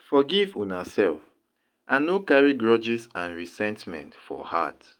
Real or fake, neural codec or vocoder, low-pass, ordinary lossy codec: fake; autoencoder, 48 kHz, 128 numbers a frame, DAC-VAE, trained on Japanese speech; 19.8 kHz; Opus, 32 kbps